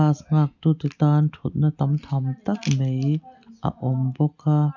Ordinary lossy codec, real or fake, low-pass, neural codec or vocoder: none; real; 7.2 kHz; none